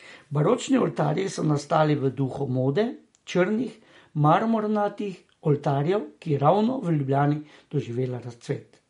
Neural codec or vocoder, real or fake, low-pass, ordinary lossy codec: none; real; 10.8 kHz; MP3, 48 kbps